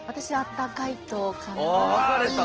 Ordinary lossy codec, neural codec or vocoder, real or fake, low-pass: Opus, 16 kbps; none; real; 7.2 kHz